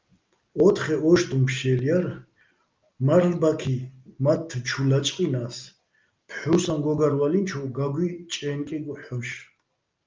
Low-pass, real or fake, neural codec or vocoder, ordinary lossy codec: 7.2 kHz; real; none; Opus, 24 kbps